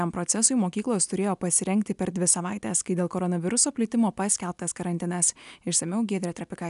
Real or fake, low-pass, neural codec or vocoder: real; 10.8 kHz; none